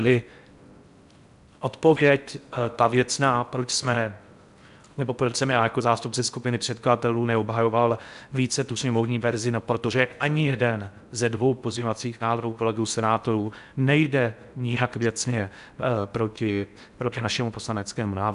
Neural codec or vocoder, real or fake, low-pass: codec, 16 kHz in and 24 kHz out, 0.6 kbps, FocalCodec, streaming, 4096 codes; fake; 10.8 kHz